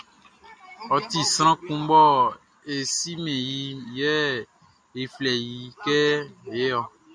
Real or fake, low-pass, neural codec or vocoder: real; 9.9 kHz; none